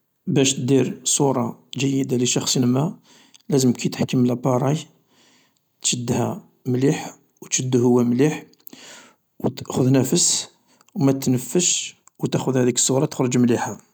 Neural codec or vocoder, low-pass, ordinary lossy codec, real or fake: none; none; none; real